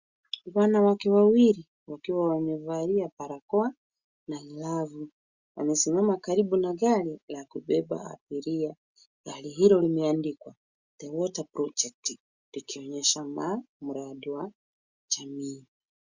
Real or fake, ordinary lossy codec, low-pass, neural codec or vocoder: real; Opus, 64 kbps; 7.2 kHz; none